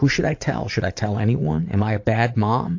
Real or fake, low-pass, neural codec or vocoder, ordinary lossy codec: fake; 7.2 kHz; vocoder, 44.1 kHz, 80 mel bands, Vocos; AAC, 48 kbps